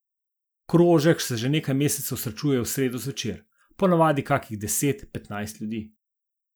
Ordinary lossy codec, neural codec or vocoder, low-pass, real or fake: none; none; none; real